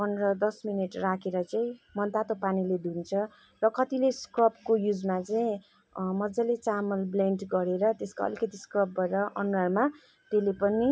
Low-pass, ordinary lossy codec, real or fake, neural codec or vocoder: none; none; real; none